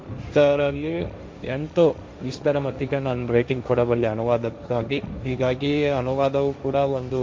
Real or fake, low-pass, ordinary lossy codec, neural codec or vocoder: fake; none; none; codec, 16 kHz, 1.1 kbps, Voila-Tokenizer